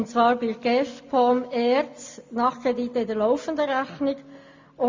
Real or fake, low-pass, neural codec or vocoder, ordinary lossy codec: real; 7.2 kHz; none; MP3, 48 kbps